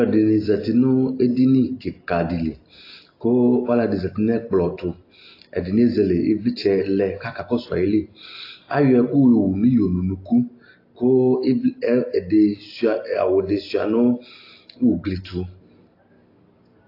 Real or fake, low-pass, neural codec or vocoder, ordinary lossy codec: real; 5.4 kHz; none; AAC, 32 kbps